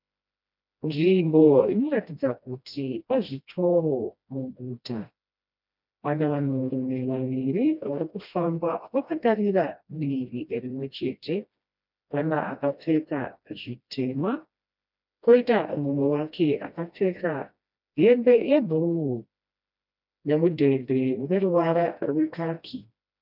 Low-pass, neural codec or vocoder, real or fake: 5.4 kHz; codec, 16 kHz, 1 kbps, FreqCodec, smaller model; fake